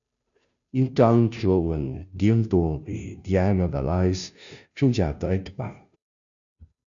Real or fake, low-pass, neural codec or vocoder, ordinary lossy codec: fake; 7.2 kHz; codec, 16 kHz, 0.5 kbps, FunCodec, trained on Chinese and English, 25 frames a second; MP3, 96 kbps